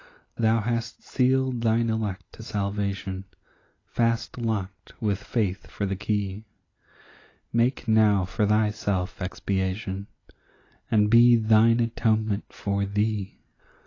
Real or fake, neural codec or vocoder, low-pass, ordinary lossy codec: real; none; 7.2 kHz; AAC, 32 kbps